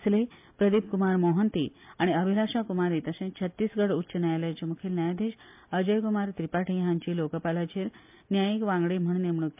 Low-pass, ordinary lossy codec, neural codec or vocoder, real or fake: 3.6 kHz; MP3, 32 kbps; none; real